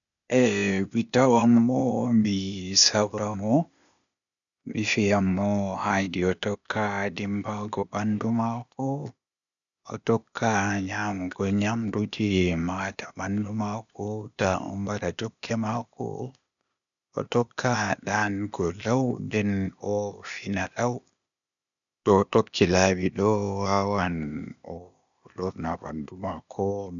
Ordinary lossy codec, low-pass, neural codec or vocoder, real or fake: none; 7.2 kHz; codec, 16 kHz, 0.8 kbps, ZipCodec; fake